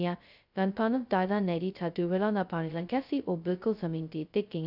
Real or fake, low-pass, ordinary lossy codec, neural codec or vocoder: fake; 5.4 kHz; none; codec, 16 kHz, 0.2 kbps, FocalCodec